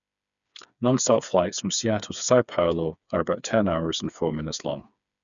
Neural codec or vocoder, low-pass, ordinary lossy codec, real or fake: codec, 16 kHz, 4 kbps, FreqCodec, smaller model; 7.2 kHz; none; fake